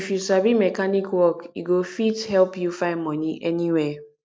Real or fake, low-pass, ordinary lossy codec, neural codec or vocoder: real; none; none; none